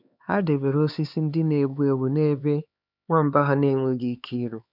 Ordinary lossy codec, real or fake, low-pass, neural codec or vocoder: none; fake; 5.4 kHz; codec, 16 kHz, 4 kbps, X-Codec, HuBERT features, trained on LibriSpeech